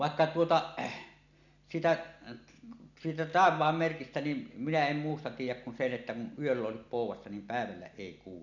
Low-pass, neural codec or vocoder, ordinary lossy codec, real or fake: 7.2 kHz; none; none; real